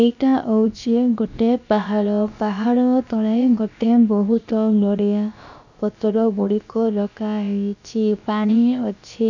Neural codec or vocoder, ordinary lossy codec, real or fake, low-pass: codec, 16 kHz, about 1 kbps, DyCAST, with the encoder's durations; none; fake; 7.2 kHz